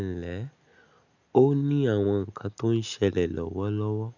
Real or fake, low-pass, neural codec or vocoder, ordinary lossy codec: real; 7.2 kHz; none; none